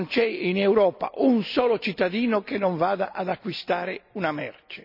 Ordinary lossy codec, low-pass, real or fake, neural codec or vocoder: none; 5.4 kHz; real; none